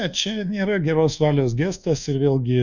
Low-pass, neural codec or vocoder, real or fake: 7.2 kHz; codec, 24 kHz, 1.2 kbps, DualCodec; fake